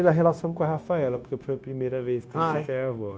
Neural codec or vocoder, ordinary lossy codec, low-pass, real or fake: codec, 16 kHz, 0.9 kbps, LongCat-Audio-Codec; none; none; fake